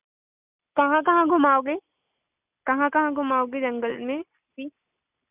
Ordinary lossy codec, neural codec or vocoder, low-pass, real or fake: none; none; 3.6 kHz; real